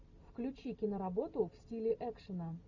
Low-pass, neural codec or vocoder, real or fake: 7.2 kHz; none; real